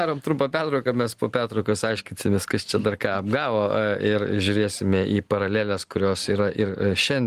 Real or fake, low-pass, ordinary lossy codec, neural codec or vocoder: real; 14.4 kHz; Opus, 24 kbps; none